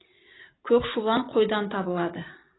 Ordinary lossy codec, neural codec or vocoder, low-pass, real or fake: AAC, 16 kbps; none; 7.2 kHz; real